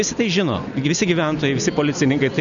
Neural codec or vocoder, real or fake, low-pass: none; real; 7.2 kHz